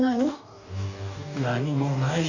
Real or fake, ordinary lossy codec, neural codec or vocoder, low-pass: fake; none; codec, 44.1 kHz, 2.6 kbps, DAC; 7.2 kHz